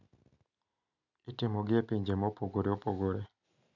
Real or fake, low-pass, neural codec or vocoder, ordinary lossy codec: real; 7.2 kHz; none; none